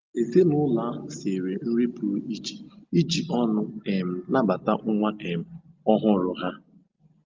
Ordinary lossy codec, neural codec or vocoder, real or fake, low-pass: Opus, 24 kbps; none; real; 7.2 kHz